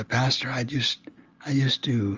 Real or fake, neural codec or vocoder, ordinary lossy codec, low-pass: real; none; Opus, 32 kbps; 7.2 kHz